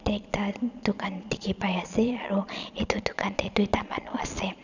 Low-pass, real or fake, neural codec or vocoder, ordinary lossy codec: 7.2 kHz; fake; vocoder, 22.05 kHz, 80 mel bands, Vocos; none